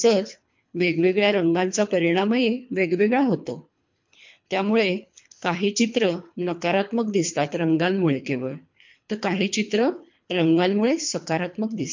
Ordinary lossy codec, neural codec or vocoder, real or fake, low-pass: MP3, 48 kbps; codec, 24 kHz, 3 kbps, HILCodec; fake; 7.2 kHz